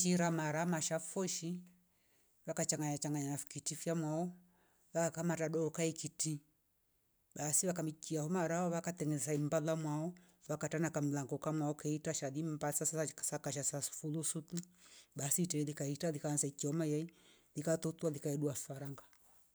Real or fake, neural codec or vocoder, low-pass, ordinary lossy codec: real; none; none; none